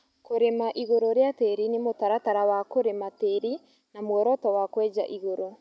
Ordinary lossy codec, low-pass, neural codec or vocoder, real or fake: none; none; none; real